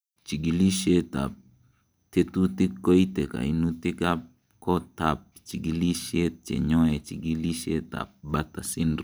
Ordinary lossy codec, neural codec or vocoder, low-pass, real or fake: none; none; none; real